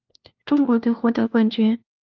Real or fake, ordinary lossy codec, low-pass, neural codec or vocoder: fake; Opus, 24 kbps; 7.2 kHz; codec, 16 kHz, 1 kbps, FunCodec, trained on LibriTTS, 50 frames a second